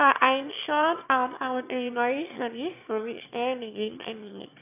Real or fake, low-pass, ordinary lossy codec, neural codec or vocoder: fake; 3.6 kHz; none; autoencoder, 22.05 kHz, a latent of 192 numbers a frame, VITS, trained on one speaker